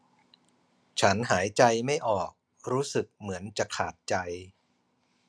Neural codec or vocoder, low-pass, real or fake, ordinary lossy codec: none; none; real; none